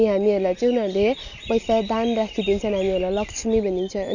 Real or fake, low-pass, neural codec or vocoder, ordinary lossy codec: real; 7.2 kHz; none; none